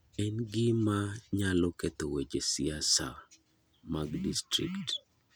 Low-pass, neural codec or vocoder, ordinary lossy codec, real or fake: none; none; none; real